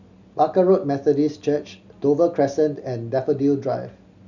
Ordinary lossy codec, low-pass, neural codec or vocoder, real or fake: none; 7.2 kHz; none; real